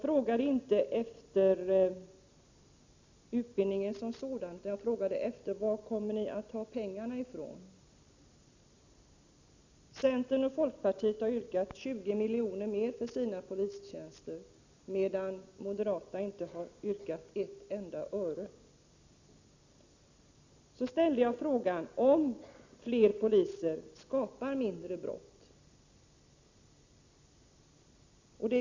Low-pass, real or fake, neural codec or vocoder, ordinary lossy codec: 7.2 kHz; real; none; Opus, 64 kbps